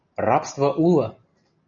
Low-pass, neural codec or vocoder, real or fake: 7.2 kHz; none; real